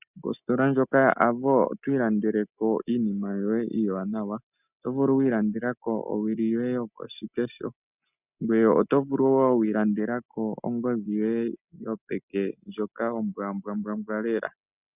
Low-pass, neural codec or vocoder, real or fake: 3.6 kHz; none; real